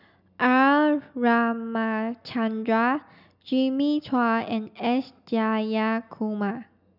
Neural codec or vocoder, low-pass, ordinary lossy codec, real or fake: none; 5.4 kHz; none; real